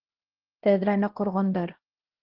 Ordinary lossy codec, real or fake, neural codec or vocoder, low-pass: Opus, 16 kbps; fake; codec, 16 kHz, 1 kbps, X-Codec, HuBERT features, trained on LibriSpeech; 5.4 kHz